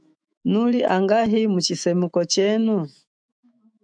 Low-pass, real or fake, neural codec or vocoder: 9.9 kHz; fake; autoencoder, 48 kHz, 128 numbers a frame, DAC-VAE, trained on Japanese speech